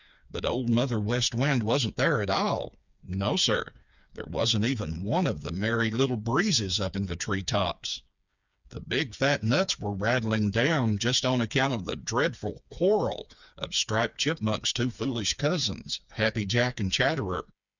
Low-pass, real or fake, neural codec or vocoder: 7.2 kHz; fake; codec, 16 kHz, 4 kbps, FreqCodec, smaller model